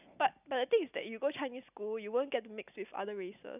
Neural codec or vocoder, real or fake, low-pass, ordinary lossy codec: none; real; 3.6 kHz; none